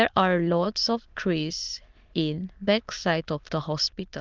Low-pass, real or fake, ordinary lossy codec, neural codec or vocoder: 7.2 kHz; fake; Opus, 32 kbps; autoencoder, 22.05 kHz, a latent of 192 numbers a frame, VITS, trained on many speakers